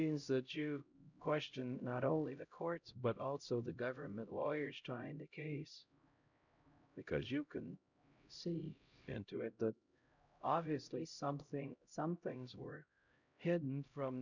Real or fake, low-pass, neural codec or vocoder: fake; 7.2 kHz; codec, 16 kHz, 0.5 kbps, X-Codec, HuBERT features, trained on LibriSpeech